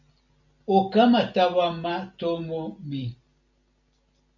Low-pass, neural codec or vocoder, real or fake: 7.2 kHz; none; real